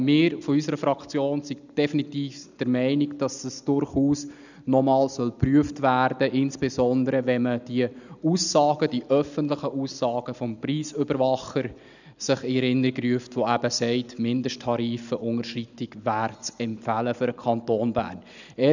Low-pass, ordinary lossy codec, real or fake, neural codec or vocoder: 7.2 kHz; none; real; none